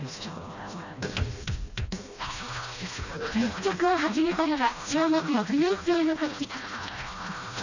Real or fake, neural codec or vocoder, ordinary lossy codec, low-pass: fake; codec, 16 kHz, 1 kbps, FreqCodec, smaller model; none; 7.2 kHz